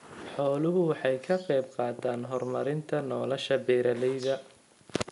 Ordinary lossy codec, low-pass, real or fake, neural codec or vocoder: none; 10.8 kHz; real; none